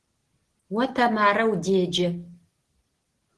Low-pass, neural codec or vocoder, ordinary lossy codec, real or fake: 10.8 kHz; none; Opus, 16 kbps; real